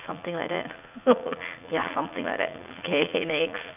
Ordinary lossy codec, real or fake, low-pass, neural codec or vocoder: none; fake; 3.6 kHz; vocoder, 44.1 kHz, 80 mel bands, Vocos